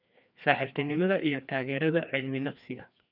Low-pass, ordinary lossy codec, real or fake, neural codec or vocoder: 5.4 kHz; none; fake; codec, 16 kHz, 2 kbps, FreqCodec, larger model